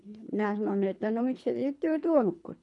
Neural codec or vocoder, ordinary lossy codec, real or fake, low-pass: codec, 24 kHz, 3 kbps, HILCodec; none; fake; 10.8 kHz